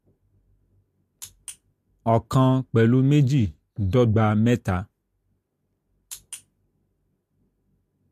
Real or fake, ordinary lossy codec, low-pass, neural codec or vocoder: real; MP3, 64 kbps; 14.4 kHz; none